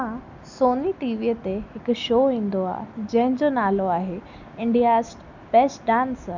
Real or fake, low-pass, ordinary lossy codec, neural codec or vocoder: real; 7.2 kHz; none; none